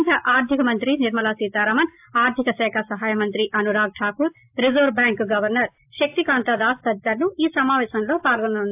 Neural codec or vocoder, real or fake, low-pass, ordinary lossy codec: none; real; 3.6 kHz; AAC, 32 kbps